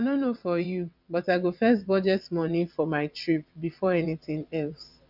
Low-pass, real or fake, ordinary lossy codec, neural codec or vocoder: 5.4 kHz; fake; none; vocoder, 22.05 kHz, 80 mel bands, WaveNeXt